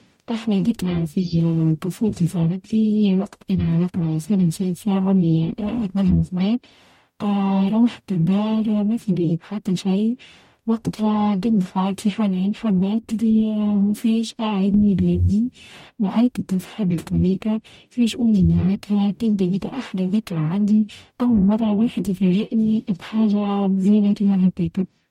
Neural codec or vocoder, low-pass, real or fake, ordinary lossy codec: codec, 44.1 kHz, 0.9 kbps, DAC; 19.8 kHz; fake; MP3, 64 kbps